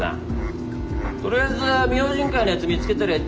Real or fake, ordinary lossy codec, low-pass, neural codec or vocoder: real; none; none; none